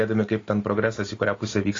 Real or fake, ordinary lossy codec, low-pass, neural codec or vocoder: real; AAC, 32 kbps; 7.2 kHz; none